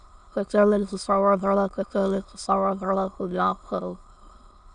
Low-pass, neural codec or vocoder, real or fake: 9.9 kHz; autoencoder, 22.05 kHz, a latent of 192 numbers a frame, VITS, trained on many speakers; fake